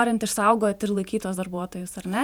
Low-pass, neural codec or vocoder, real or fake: 19.8 kHz; vocoder, 48 kHz, 128 mel bands, Vocos; fake